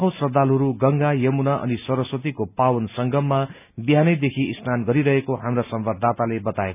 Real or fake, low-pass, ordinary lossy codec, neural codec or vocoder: real; 3.6 kHz; none; none